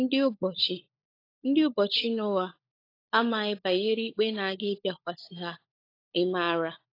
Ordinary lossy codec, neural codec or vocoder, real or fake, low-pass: AAC, 32 kbps; codec, 16 kHz, 16 kbps, FunCodec, trained on LibriTTS, 50 frames a second; fake; 5.4 kHz